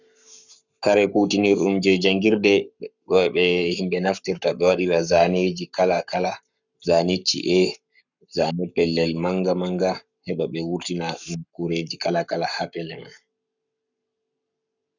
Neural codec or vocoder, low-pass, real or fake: codec, 44.1 kHz, 7.8 kbps, Pupu-Codec; 7.2 kHz; fake